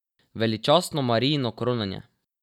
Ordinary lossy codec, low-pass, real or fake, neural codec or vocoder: none; 19.8 kHz; real; none